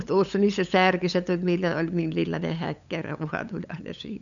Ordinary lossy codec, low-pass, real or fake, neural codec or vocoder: none; 7.2 kHz; fake; codec, 16 kHz, 8 kbps, FunCodec, trained on LibriTTS, 25 frames a second